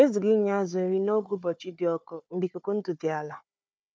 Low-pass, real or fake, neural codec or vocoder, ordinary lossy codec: none; fake; codec, 16 kHz, 4 kbps, FunCodec, trained on Chinese and English, 50 frames a second; none